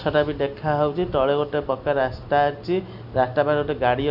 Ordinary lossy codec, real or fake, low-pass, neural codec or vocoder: none; real; 5.4 kHz; none